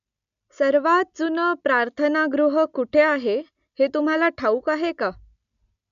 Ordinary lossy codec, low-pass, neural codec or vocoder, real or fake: none; 7.2 kHz; none; real